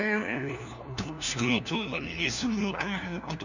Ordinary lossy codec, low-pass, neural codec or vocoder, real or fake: none; 7.2 kHz; codec, 16 kHz, 1 kbps, FunCodec, trained on LibriTTS, 50 frames a second; fake